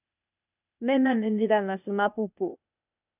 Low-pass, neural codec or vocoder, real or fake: 3.6 kHz; codec, 16 kHz, 0.8 kbps, ZipCodec; fake